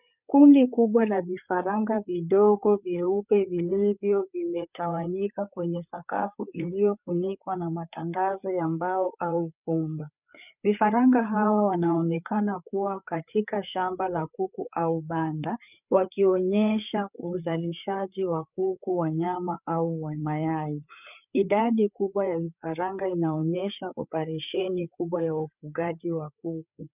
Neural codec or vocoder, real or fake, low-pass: codec, 16 kHz, 4 kbps, FreqCodec, larger model; fake; 3.6 kHz